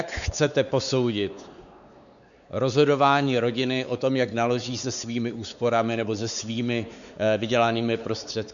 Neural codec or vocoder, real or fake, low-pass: codec, 16 kHz, 4 kbps, X-Codec, WavLM features, trained on Multilingual LibriSpeech; fake; 7.2 kHz